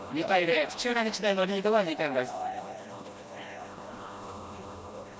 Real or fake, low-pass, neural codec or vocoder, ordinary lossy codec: fake; none; codec, 16 kHz, 1 kbps, FreqCodec, smaller model; none